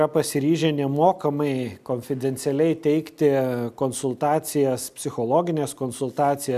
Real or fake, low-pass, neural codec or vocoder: real; 14.4 kHz; none